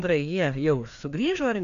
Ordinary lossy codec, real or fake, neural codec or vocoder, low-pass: AAC, 96 kbps; fake; codec, 16 kHz, 2 kbps, FreqCodec, larger model; 7.2 kHz